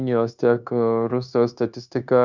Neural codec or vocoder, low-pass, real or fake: codec, 16 kHz, 0.9 kbps, LongCat-Audio-Codec; 7.2 kHz; fake